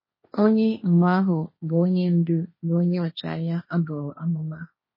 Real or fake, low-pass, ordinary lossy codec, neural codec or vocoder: fake; 5.4 kHz; MP3, 24 kbps; codec, 16 kHz, 1.1 kbps, Voila-Tokenizer